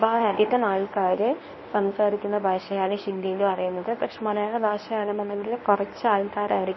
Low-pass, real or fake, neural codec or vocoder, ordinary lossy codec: 7.2 kHz; fake; codec, 24 kHz, 0.9 kbps, WavTokenizer, medium speech release version 1; MP3, 24 kbps